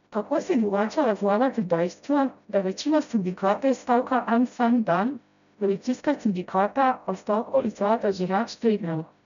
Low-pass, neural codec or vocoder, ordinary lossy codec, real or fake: 7.2 kHz; codec, 16 kHz, 0.5 kbps, FreqCodec, smaller model; none; fake